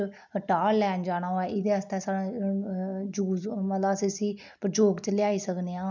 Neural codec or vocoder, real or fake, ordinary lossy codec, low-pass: none; real; none; 7.2 kHz